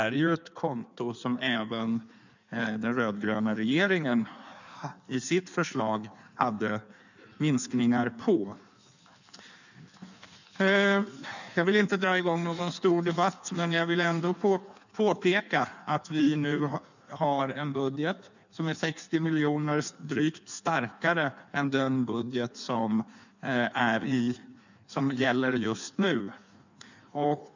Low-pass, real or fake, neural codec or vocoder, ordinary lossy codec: 7.2 kHz; fake; codec, 16 kHz in and 24 kHz out, 1.1 kbps, FireRedTTS-2 codec; none